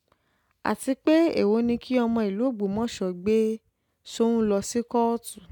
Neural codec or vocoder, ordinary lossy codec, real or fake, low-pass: none; none; real; 19.8 kHz